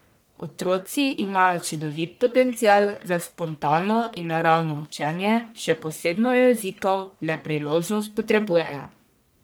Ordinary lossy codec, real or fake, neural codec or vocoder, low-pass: none; fake; codec, 44.1 kHz, 1.7 kbps, Pupu-Codec; none